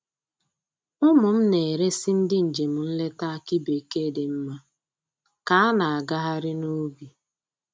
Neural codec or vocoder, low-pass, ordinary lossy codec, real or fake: none; none; none; real